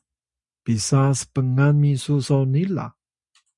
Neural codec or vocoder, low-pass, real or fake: none; 10.8 kHz; real